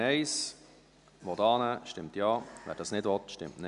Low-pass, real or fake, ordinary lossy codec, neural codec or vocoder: 10.8 kHz; real; none; none